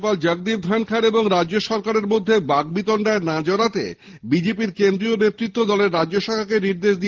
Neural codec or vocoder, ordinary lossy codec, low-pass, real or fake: none; Opus, 16 kbps; 7.2 kHz; real